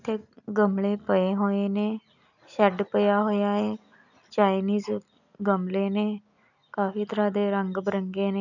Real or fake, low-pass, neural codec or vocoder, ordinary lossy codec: real; 7.2 kHz; none; none